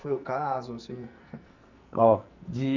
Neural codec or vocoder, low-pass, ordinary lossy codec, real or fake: codec, 44.1 kHz, 2.6 kbps, SNAC; 7.2 kHz; none; fake